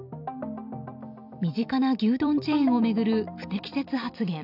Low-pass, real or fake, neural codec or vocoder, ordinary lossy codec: 5.4 kHz; fake; vocoder, 44.1 kHz, 128 mel bands every 256 samples, BigVGAN v2; none